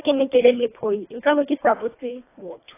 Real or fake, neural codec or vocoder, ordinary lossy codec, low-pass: fake; codec, 24 kHz, 1.5 kbps, HILCodec; AAC, 24 kbps; 3.6 kHz